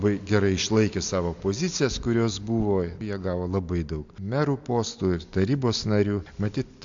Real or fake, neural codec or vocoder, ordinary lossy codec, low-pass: real; none; AAC, 64 kbps; 7.2 kHz